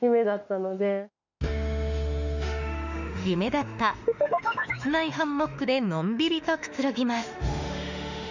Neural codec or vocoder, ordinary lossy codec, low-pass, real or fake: autoencoder, 48 kHz, 32 numbers a frame, DAC-VAE, trained on Japanese speech; none; 7.2 kHz; fake